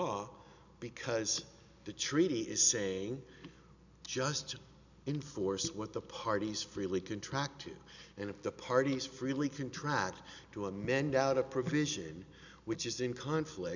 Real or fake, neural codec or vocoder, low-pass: real; none; 7.2 kHz